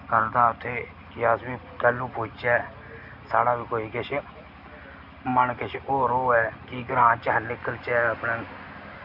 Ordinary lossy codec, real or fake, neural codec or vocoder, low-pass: none; real; none; 5.4 kHz